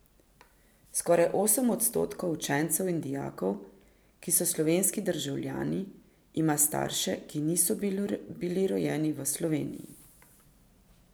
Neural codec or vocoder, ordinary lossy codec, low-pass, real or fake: vocoder, 44.1 kHz, 128 mel bands every 512 samples, BigVGAN v2; none; none; fake